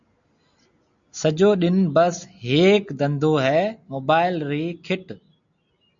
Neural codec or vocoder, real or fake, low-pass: none; real; 7.2 kHz